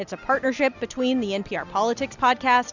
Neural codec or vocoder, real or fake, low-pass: none; real; 7.2 kHz